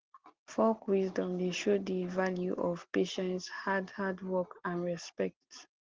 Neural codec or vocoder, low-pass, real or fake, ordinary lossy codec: none; 7.2 kHz; real; Opus, 16 kbps